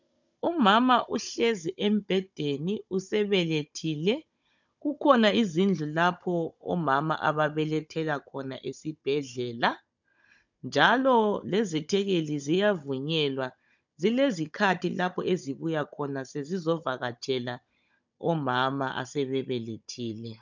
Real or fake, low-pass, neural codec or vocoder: fake; 7.2 kHz; codec, 16 kHz, 16 kbps, FunCodec, trained on Chinese and English, 50 frames a second